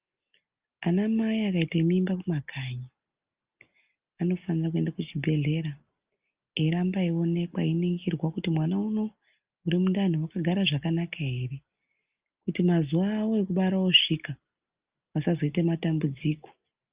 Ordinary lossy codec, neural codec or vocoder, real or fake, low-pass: Opus, 24 kbps; none; real; 3.6 kHz